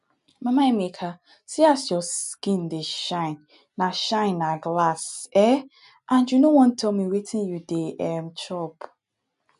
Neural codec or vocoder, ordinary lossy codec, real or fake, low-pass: none; none; real; 10.8 kHz